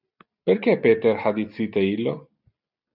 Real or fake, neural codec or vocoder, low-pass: real; none; 5.4 kHz